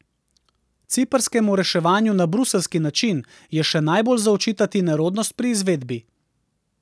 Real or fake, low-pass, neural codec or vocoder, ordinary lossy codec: real; none; none; none